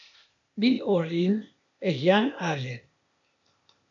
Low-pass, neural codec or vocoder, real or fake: 7.2 kHz; codec, 16 kHz, 0.8 kbps, ZipCodec; fake